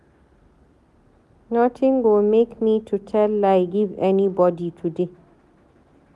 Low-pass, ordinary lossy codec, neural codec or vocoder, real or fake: none; none; none; real